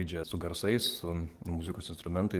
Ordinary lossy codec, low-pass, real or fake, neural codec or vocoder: Opus, 24 kbps; 14.4 kHz; fake; codec, 44.1 kHz, 7.8 kbps, DAC